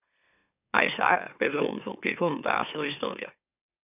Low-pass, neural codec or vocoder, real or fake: 3.6 kHz; autoencoder, 44.1 kHz, a latent of 192 numbers a frame, MeloTTS; fake